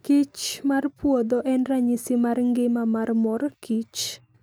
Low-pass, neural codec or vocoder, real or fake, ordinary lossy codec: none; none; real; none